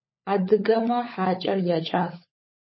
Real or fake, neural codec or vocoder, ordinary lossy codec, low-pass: fake; codec, 16 kHz, 16 kbps, FunCodec, trained on LibriTTS, 50 frames a second; MP3, 24 kbps; 7.2 kHz